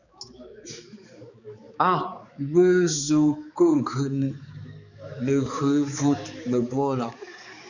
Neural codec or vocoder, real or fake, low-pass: codec, 16 kHz, 4 kbps, X-Codec, HuBERT features, trained on balanced general audio; fake; 7.2 kHz